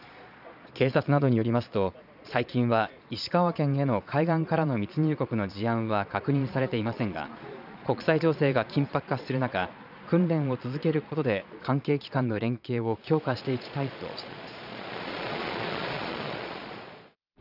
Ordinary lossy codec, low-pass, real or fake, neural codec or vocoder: none; 5.4 kHz; fake; vocoder, 22.05 kHz, 80 mel bands, Vocos